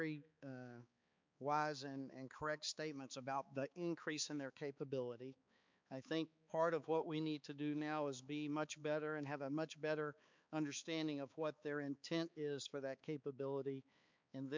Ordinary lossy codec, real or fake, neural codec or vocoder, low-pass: MP3, 64 kbps; fake; codec, 16 kHz, 4 kbps, X-Codec, HuBERT features, trained on balanced general audio; 7.2 kHz